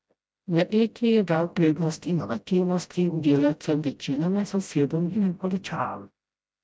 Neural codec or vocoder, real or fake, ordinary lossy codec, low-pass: codec, 16 kHz, 0.5 kbps, FreqCodec, smaller model; fake; none; none